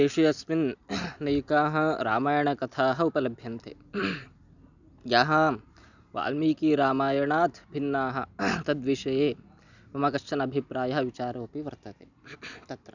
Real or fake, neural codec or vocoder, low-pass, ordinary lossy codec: real; none; 7.2 kHz; none